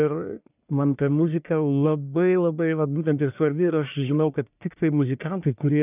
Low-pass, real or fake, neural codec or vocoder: 3.6 kHz; fake; codec, 44.1 kHz, 1.7 kbps, Pupu-Codec